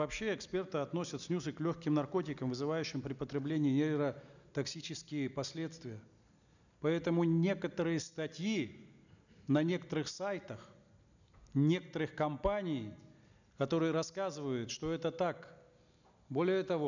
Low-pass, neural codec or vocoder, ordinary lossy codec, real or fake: 7.2 kHz; none; none; real